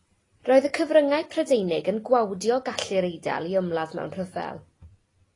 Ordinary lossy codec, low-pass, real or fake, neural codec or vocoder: AAC, 32 kbps; 10.8 kHz; real; none